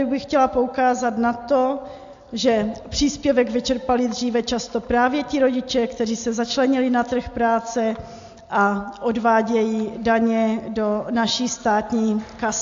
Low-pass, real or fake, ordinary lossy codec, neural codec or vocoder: 7.2 kHz; real; AAC, 64 kbps; none